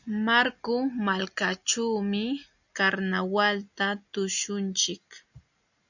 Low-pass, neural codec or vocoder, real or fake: 7.2 kHz; none; real